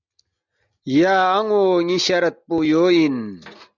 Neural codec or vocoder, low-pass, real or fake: none; 7.2 kHz; real